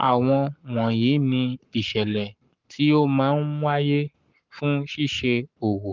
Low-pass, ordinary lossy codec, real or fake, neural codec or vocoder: 7.2 kHz; Opus, 32 kbps; fake; codec, 44.1 kHz, 7.8 kbps, Pupu-Codec